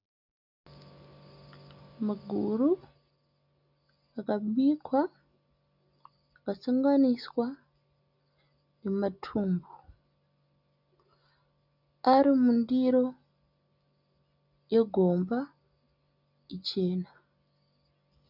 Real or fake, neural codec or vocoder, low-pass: real; none; 5.4 kHz